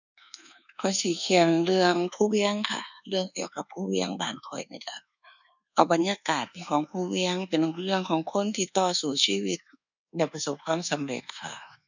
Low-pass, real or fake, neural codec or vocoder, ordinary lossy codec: 7.2 kHz; fake; codec, 24 kHz, 1.2 kbps, DualCodec; none